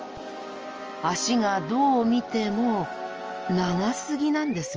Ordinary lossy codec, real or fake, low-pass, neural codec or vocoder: Opus, 24 kbps; real; 7.2 kHz; none